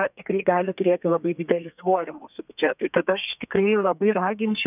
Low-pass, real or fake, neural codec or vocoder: 3.6 kHz; fake; codec, 32 kHz, 1.9 kbps, SNAC